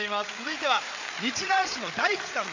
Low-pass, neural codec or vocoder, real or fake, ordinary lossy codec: 7.2 kHz; codec, 16 kHz, 8 kbps, FreqCodec, larger model; fake; MP3, 48 kbps